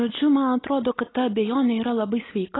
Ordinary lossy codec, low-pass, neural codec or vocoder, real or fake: AAC, 16 kbps; 7.2 kHz; none; real